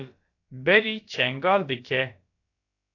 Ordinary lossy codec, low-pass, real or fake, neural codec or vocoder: AAC, 48 kbps; 7.2 kHz; fake; codec, 16 kHz, about 1 kbps, DyCAST, with the encoder's durations